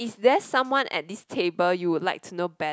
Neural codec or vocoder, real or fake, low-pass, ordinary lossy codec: none; real; none; none